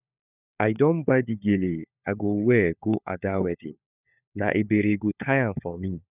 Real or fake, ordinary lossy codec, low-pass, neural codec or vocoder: fake; none; 3.6 kHz; codec, 16 kHz, 4 kbps, FunCodec, trained on LibriTTS, 50 frames a second